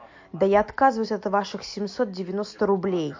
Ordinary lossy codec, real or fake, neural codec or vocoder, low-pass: MP3, 48 kbps; real; none; 7.2 kHz